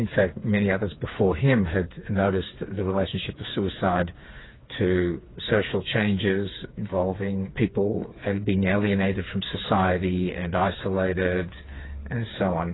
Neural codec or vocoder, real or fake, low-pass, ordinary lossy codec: codec, 16 kHz, 4 kbps, FreqCodec, smaller model; fake; 7.2 kHz; AAC, 16 kbps